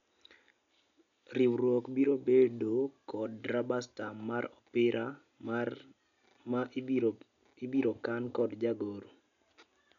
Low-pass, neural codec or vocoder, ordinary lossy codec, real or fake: 7.2 kHz; none; none; real